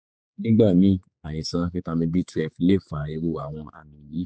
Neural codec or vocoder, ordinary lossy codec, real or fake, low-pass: codec, 16 kHz, 4 kbps, X-Codec, HuBERT features, trained on general audio; none; fake; none